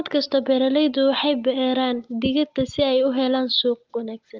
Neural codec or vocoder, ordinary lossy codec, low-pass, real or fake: none; Opus, 32 kbps; 7.2 kHz; real